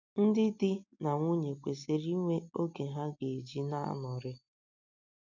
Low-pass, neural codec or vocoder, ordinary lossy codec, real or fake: 7.2 kHz; none; MP3, 64 kbps; real